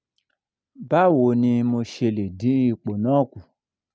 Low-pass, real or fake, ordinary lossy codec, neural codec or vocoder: none; real; none; none